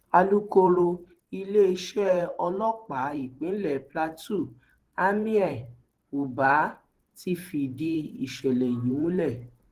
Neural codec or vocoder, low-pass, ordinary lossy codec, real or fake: vocoder, 44.1 kHz, 128 mel bands every 512 samples, BigVGAN v2; 14.4 kHz; Opus, 16 kbps; fake